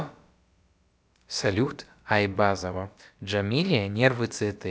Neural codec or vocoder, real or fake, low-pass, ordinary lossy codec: codec, 16 kHz, about 1 kbps, DyCAST, with the encoder's durations; fake; none; none